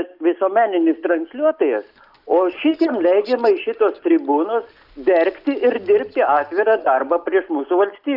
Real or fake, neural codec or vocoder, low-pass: real; none; 5.4 kHz